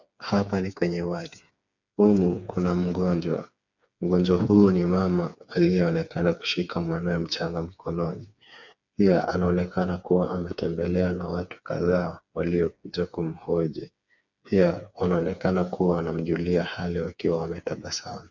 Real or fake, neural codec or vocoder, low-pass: fake; codec, 16 kHz, 4 kbps, FreqCodec, smaller model; 7.2 kHz